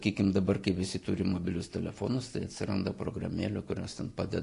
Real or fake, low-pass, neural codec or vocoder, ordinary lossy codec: real; 10.8 kHz; none; AAC, 48 kbps